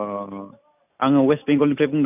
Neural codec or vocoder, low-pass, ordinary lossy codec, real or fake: none; 3.6 kHz; none; real